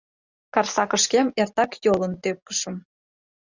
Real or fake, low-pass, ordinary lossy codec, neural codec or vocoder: real; 7.2 kHz; Opus, 64 kbps; none